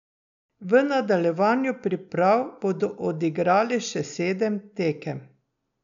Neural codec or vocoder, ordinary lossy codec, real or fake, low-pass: none; none; real; 7.2 kHz